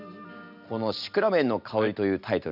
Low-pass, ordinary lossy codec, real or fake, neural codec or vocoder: 5.4 kHz; none; real; none